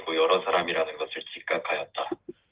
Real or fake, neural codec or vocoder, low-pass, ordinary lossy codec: real; none; 3.6 kHz; Opus, 16 kbps